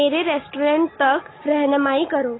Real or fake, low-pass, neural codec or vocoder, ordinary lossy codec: real; 7.2 kHz; none; AAC, 16 kbps